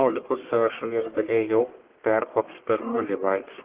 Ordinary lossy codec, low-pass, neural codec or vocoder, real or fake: Opus, 16 kbps; 3.6 kHz; codec, 44.1 kHz, 1.7 kbps, Pupu-Codec; fake